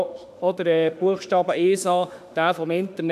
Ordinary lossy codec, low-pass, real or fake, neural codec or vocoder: none; 14.4 kHz; fake; autoencoder, 48 kHz, 32 numbers a frame, DAC-VAE, trained on Japanese speech